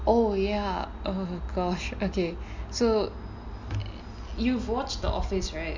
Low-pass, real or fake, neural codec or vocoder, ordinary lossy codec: 7.2 kHz; real; none; MP3, 64 kbps